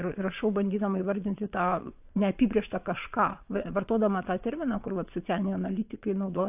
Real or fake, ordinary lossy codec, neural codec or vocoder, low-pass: fake; AAC, 32 kbps; vocoder, 22.05 kHz, 80 mel bands, WaveNeXt; 3.6 kHz